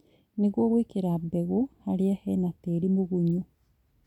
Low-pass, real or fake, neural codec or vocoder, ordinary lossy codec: 19.8 kHz; real; none; none